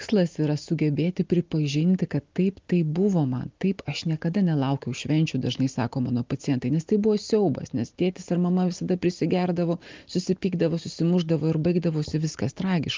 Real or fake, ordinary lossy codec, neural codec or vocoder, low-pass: real; Opus, 32 kbps; none; 7.2 kHz